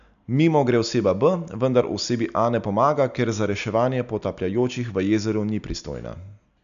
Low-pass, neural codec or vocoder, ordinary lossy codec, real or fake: 7.2 kHz; none; none; real